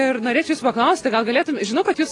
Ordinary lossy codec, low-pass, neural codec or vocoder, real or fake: AAC, 32 kbps; 10.8 kHz; none; real